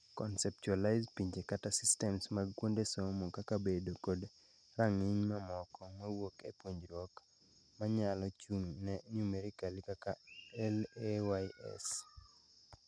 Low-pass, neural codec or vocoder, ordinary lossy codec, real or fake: 9.9 kHz; none; none; real